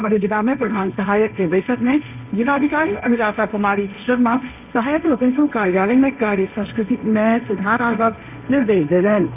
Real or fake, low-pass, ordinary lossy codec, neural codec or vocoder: fake; 3.6 kHz; none; codec, 16 kHz, 1.1 kbps, Voila-Tokenizer